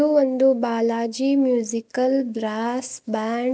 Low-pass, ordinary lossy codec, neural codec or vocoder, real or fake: none; none; none; real